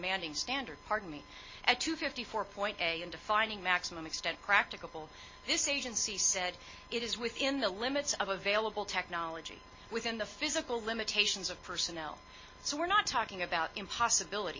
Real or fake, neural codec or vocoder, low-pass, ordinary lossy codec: real; none; 7.2 kHz; MP3, 32 kbps